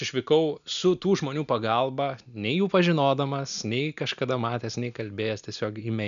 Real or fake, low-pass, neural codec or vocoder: real; 7.2 kHz; none